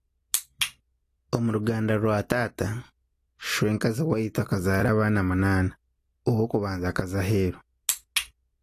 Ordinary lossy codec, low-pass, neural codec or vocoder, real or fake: AAC, 48 kbps; 14.4 kHz; none; real